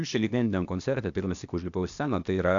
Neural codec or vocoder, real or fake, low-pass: codec, 16 kHz, 0.8 kbps, ZipCodec; fake; 7.2 kHz